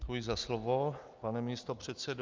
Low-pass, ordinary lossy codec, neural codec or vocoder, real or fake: 7.2 kHz; Opus, 24 kbps; none; real